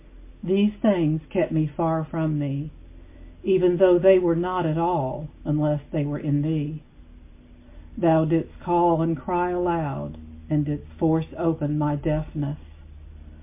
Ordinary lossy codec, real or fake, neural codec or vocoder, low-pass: MP3, 24 kbps; real; none; 3.6 kHz